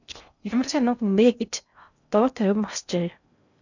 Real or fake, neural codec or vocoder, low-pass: fake; codec, 16 kHz in and 24 kHz out, 0.6 kbps, FocalCodec, streaming, 2048 codes; 7.2 kHz